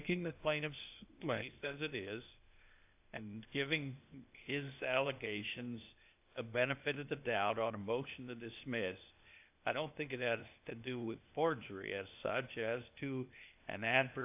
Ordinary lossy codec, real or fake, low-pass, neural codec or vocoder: AAC, 32 kbps; fake; 3.6 kHz; codec, 16 kHz, 0.8 kbps, ZipCodec